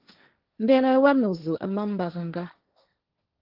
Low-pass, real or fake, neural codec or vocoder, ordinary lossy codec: 5.4 kHz; fake; codec, 16 kHz, 1.1 kbps, Voila-Tokenizer; Opus, 32 kbps